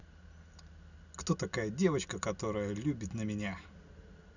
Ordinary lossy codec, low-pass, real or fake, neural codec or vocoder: none; 7.2 kHz; real; none